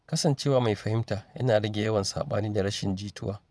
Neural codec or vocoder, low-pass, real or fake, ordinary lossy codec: vocoder, 22.05 kHz, 80 mel bands, Vocos; none; fake; none